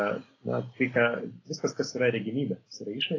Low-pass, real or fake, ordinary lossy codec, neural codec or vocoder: 7.2 kHz; real; AAC, 32 kbps; none